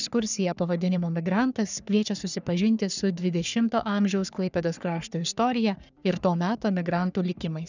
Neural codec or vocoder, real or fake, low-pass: codec, 44.1 kHz, 3.4 kbps, Pupu-Codec; fake; 7.2 kHz